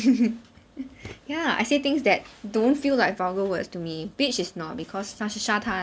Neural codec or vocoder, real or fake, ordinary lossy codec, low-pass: none; real; none; none